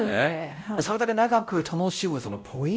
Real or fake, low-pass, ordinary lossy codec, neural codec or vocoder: fake; none; none; codec, 16 kHz, 0.5 kbps, X-Codec, WavLM features, trained on Multilingual LibriSpeech